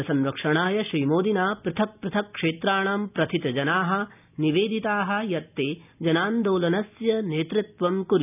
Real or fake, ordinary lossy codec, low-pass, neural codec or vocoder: real; none; 3.6 kHz; none